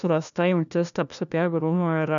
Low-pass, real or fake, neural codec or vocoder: 7.2 kHz; fake; codec, 16 kHz, 1 kbps, FunCodec, trained on LibriTTS, 50 frames a second